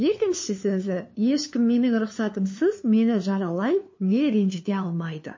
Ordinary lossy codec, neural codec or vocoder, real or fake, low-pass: MP3, 32 kbps; codec, 16 kHz, 2 kbps, FunCodec, trained on LibriTTS, 25 frames a second; fake; 7.2 kHz